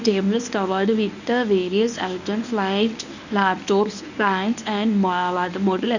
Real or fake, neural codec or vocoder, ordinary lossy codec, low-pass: fake; codec, 24 kHz, 0.9 kbps, WavTokenizer, medium speech release version 1; none; 7.2 kHz